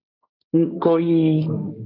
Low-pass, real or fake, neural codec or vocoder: 5.4 kHz; fake; codec, 16 kHz, 1.1 kbps, Voila-Tokenizer